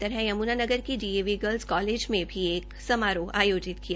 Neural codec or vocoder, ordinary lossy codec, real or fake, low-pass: none; none; real; none